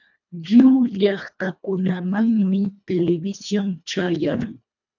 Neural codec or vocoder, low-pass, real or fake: codec, 24 kHz, 1.5 kbps, HILCodec; 7.2 kHz; fake